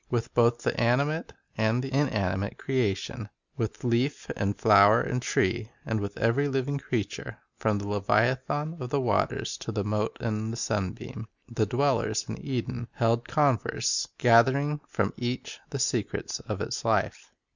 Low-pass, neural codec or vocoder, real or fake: 7.2 kHz; none; real